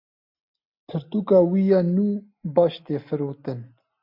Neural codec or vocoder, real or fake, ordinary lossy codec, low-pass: none; real; MP3, 48 kbps; 5.4 kHz